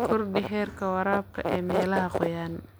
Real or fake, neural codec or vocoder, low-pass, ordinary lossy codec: real; none; none; none